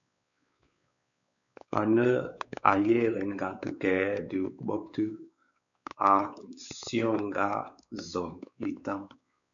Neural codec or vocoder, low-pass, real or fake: codec, 16 kHz, 4 kbps, X-Codec, WavLM features, trained on Multilingual LibriSpeech; 7.2 kHz; fake